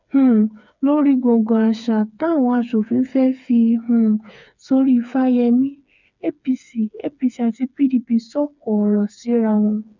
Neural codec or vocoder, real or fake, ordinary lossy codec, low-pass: codec, 16 kHz, 4 kbps, FreqCodec, smaller model; fake; none; 7.2 kHz